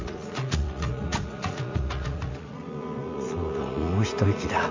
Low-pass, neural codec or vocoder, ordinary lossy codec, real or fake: 7.2 kHz; vocoder, 44.1 kHz, 80 mel bands, Vocos; MP3, 48 kbps; fake